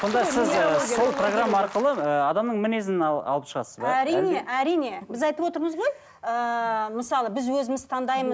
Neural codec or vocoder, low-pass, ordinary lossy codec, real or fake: none; none; none; real